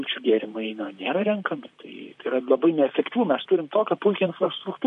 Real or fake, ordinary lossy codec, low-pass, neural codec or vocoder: fake; MP3, 48 kbps; 14.4 kHz; vocoder, 44.1 kHz, 128 mel bands, Pupu-Vocoder